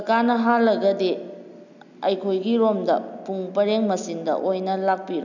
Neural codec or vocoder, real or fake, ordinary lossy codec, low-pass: none; real; none; 7.2 kHz